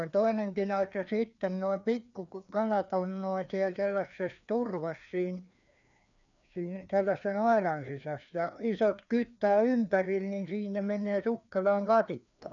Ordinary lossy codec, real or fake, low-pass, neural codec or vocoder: none; fake; 7.2 kHz; codec, 16 kHz, 2 kbps, FreqCodec, larger model